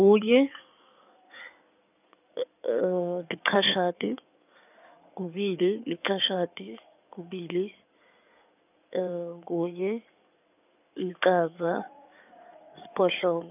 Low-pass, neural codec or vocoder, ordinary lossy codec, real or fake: 3.6 kHz; codec, 16 kHz in and 24 kHz out, 2.2 kbps, FireRedTTS-2 codec; none; fake